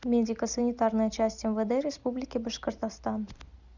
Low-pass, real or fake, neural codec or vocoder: 7.2 kHz; real; none